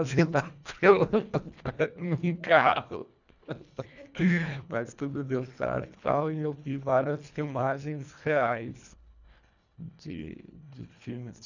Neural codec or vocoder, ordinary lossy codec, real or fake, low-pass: codec, 24 kHz, 1.5 kbps, HILCodec; none; fake; 7.2 kHz